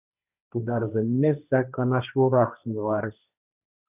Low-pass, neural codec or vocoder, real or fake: 3.6 kHz; codec, 16 kHz, 1.1 kbps, Voila-Tokenizer; fake